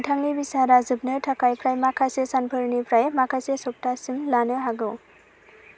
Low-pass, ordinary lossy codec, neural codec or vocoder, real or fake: none; none; none; real